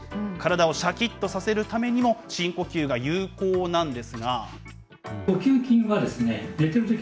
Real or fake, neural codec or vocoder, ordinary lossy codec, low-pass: real; none; none; none